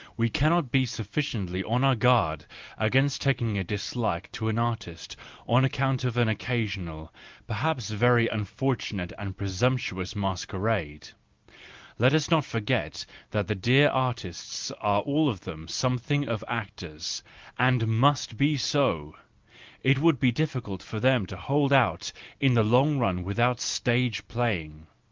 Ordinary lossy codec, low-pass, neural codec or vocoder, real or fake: Opus, 32 kbps; 7.2 kHz; none; real